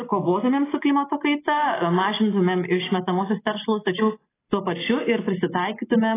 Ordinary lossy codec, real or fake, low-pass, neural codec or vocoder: AAC, 16 kbps; real; 3.6 kHz; none